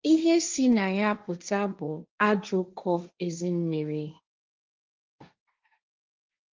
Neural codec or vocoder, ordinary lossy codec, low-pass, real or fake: codec, 16 kHz, 1.1 kbps, Voila-Tokenizer; Opus, 32 kbps; 7.2 kHz; fake